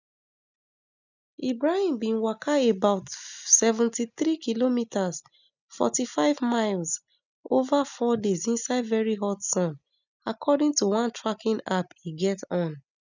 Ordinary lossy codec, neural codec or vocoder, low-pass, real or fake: none; none; 7.2 kHz; real